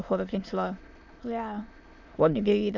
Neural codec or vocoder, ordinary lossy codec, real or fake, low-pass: autoencoder, 22.05 kHz, a latent of 192 numbers a frame, VITS, trained on many speakers; MP3, 64 kbps; fake; 7.2 kHz